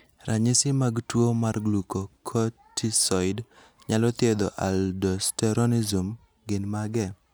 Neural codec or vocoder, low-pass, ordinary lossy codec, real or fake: none; none; none; real